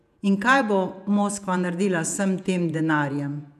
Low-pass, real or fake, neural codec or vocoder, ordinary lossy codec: 14.4 kHz; fake; vocoder, 48 kHz, 128 mel bands, Vocos; none